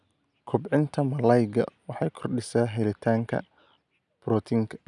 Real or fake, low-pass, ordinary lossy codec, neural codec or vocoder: real; 10.8 kHz; none; none